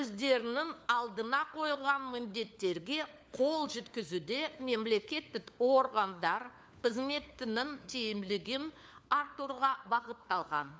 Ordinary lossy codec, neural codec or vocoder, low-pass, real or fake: none; codec, 16 kHz, 4 kbps, FunCodec, trained on LibriTTS, 50 frames a second; none; fake